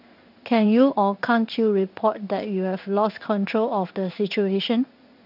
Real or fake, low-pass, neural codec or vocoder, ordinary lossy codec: fake; 5.4 kHz; codec, 16 kHz in and 24 kHz out, 1 kbps, XY-Tokenizer; none